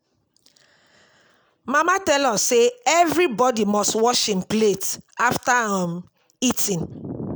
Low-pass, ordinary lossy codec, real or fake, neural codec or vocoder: none; none; real; none